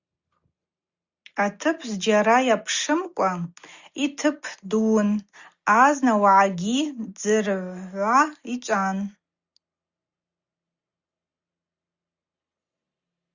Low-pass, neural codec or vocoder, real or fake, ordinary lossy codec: 7.2 kHz; none; real; Opus, 64 kbps